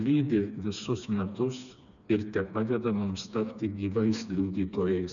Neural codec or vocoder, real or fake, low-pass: codec, 16 kHz, 2 kbps, FreqCodec, smaller model; fake; 7.2 kHz